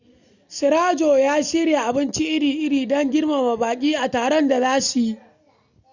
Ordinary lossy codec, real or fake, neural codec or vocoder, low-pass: none; real; none; 7.2 kHz